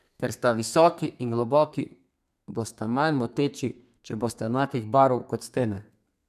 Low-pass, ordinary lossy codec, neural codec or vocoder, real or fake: 14.4 kHz; none; codec, 32 kHz, 1.9 kbps, SNAC; fake